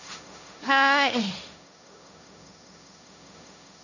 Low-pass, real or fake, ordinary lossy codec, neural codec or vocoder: 7.2 kHz; fake; none; codec, 16 kHz, 1.1 kbps, Voila-Tokenizer